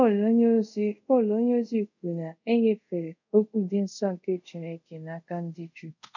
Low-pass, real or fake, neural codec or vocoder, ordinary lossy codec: 7.2 kHz; fake; codec, 24 kHz, 0.5 kbps, DualCodec; none